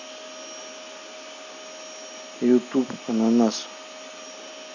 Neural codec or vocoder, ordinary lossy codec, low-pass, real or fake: none; none; 7.2 kHz; real